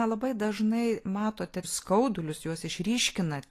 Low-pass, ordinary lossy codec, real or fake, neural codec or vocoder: 14.4 kHz; AAC, 48 kbps; real; none